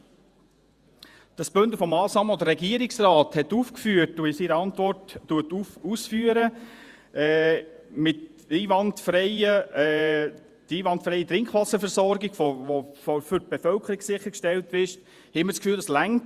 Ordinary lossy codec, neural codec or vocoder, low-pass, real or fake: Opus, 64 kbps; vocoder, 48 kHz, 128 mel bands, Vocos; 14.4 kHz; fake